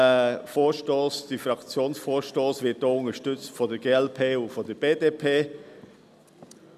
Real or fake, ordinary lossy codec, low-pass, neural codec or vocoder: real; none; 14.4 kHz; none